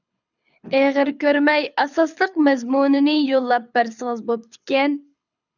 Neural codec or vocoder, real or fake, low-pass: codec, 24 kHz, 6 kbps, HILCodec; fake; 7.2 kHz